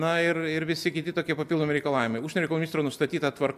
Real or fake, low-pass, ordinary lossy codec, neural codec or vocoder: real; 14.4 kHz; AAC, 96 kbps; none